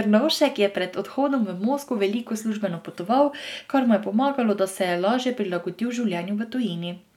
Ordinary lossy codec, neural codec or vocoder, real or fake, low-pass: none; none; real; 19.8 kHz